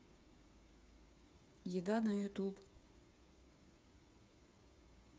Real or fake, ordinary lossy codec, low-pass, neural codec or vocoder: fake; none; none; codec, 16 kHz, 8 kbps, FreqCodec, smaller model